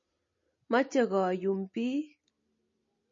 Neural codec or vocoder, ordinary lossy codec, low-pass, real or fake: none; MP3, 32 kbps; 7.2 kHz; real